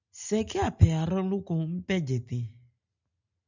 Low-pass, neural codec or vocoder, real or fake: 7.2 kHz; none; real